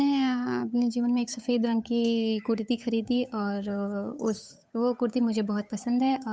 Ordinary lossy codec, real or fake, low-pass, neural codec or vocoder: none; fake; none; codec, 16 kHz, 8 kbps, FunCodec, trained on Chinese and English, 25 frames a second